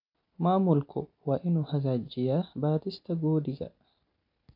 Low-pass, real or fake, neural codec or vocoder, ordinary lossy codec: 5.4 kHz; real; none; AAC, 48 kbps